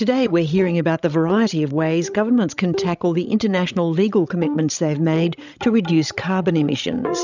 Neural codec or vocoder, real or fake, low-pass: codec, 16 kHz, 16 kbps, FreqCodec, larger model; fake; 7.2 kHz